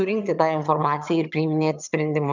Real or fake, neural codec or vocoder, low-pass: fake; vocoder, 22.05 kHz, 80 mel bands, HiFi-GAN; 7.2 kHz